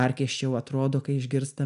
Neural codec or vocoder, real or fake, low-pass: none; real; 10.8 kHz